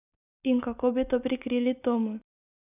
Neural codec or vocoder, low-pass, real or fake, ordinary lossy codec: none; 3.6 kHz; real; none